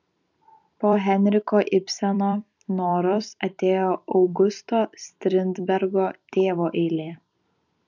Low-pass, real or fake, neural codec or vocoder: 7.2 kHz; fake; vocoder, 44.1 kHz, 128 mel bands every 256 samples, BigVGAN v2